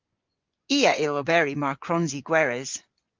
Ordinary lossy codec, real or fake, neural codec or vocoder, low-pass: Opus, 32 kbps; fake; vocoder, 24 kHz, 100 mel bands, Vocos; 7.2 kHz